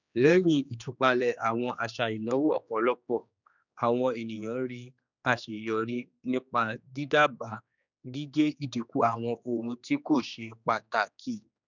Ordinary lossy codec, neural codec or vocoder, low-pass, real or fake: none; codec, 16 kHz, 2 kbps, X-Codec, HuBERT features, trained on general audio; 7.2 kHz; fake